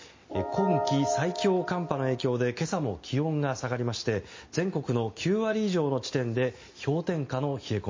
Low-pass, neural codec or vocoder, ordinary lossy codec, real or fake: 7.2 kHz; none; MP3, 32 kbps; real